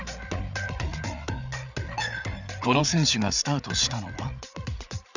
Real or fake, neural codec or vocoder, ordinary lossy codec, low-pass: fake; codec, 16 kHz, 8 kbps, FreqCodec, larger model; none; 7.2 kHz